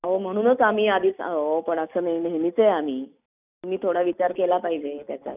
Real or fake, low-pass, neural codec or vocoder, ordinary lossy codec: real; 3.6 kHz; none; none